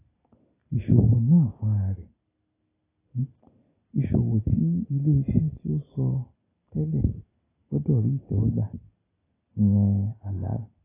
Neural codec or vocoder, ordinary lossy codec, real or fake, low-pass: none; AAC, 16 kbps; real; 3.6 kHz